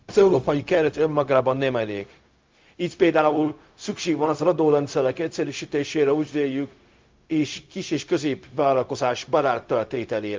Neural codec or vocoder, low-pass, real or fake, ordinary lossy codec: codec, 16 kHz, 0.4 kbps, LongCat-Audio-Codec; 7.2 kHz; fake; Opus, 32 kbps